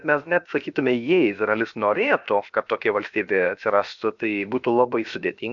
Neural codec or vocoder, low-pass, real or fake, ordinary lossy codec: codec, 16 kHz, about 1 kbps, DyCAST, with the encoder's durations; 7.2 kHz; fake; MP3, 96 kbps